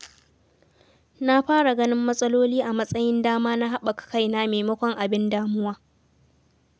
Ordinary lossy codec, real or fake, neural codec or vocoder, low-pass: none; real; none; none